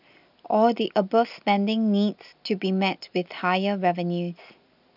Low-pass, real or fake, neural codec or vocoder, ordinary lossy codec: 5.4 kHz; real; none; none